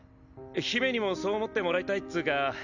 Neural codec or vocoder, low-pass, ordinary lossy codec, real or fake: none; 7.2 kHz; none; real